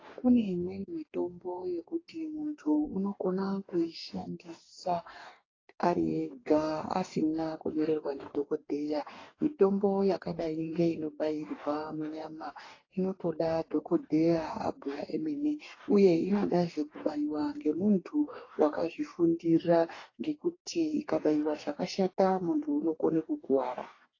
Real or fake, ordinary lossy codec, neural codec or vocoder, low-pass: fake; AAC, 32 kbps; codec, 44.1 kHz, 2.6 kbps, DAC; 7.2 kHz